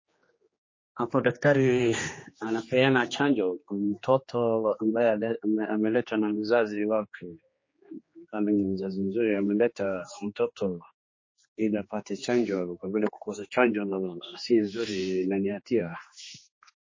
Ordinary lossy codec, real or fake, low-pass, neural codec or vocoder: MP3, 32 kbps; fake; 7.2 kHz; codec, 16 kHz, 2 kbps, X-Codec, HuBERT features, trained on general audio